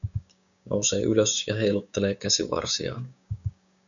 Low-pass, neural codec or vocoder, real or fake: 7.2 kHz; codec, 16 kHz, 6 kbps, DAC; fake